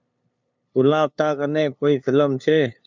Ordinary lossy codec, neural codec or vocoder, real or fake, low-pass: MP3, 64 kbps; codec, 16 kHz, 2 kbps, FunCodec, trained on LibriTTS, 25 frames a second; fake; 7.2 kHz